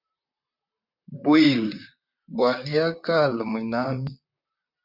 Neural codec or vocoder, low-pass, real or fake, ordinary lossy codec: vocoder, 44.1 kHz, 128 mel bands, Pupu-Vocoder; 5.4 kHz; fake; MP3, 48 kbps